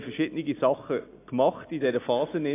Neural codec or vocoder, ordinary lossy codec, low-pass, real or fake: none; AAC, 24 kbps; 3.6 kHz; real